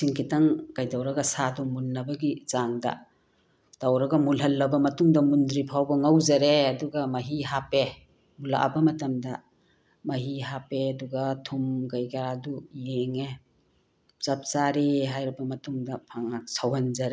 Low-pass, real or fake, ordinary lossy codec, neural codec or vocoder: none; real; none; none